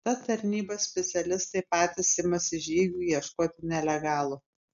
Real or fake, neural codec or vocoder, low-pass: real; none; 7.2 kHz